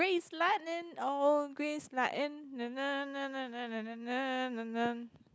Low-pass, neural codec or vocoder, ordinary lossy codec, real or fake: none; none; none; real